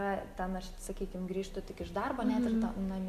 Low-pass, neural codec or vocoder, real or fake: 14.4 kHz; none; real